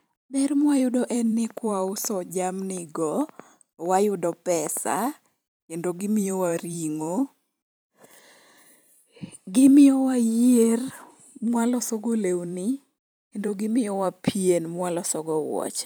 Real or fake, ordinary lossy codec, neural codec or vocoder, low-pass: fake; none; vocoder, 44.1 kHz, 128 mel bands every 512 samples, BigVGAN v2; none